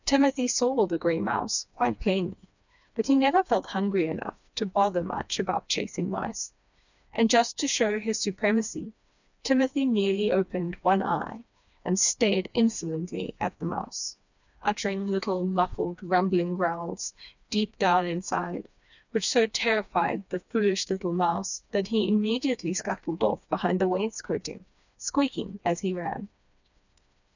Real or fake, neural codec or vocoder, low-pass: fake; codec, 16 kHz, 2 kbps, FreqCodec, smaller model; 7.2 kHz